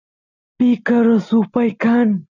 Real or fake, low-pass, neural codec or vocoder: real; 7.2 kHz; none